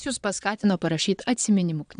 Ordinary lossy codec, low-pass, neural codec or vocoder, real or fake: AAC, 96 kbps; 9.9 kHz; vocoder, 22.05 kHz, 80 mel bands, Vocos; fake